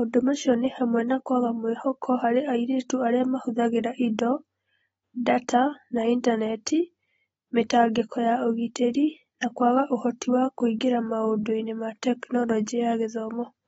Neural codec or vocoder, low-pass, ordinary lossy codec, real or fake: none; 10.8 kHz; AAC, 24 kbps; real